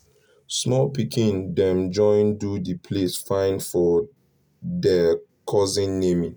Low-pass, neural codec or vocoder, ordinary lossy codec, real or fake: none; none; none; real